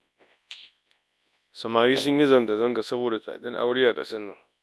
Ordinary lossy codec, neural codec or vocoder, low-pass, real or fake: none; codec, 24 kHz, 0.9 kbps, WavTokenizer, large speech release; none; fake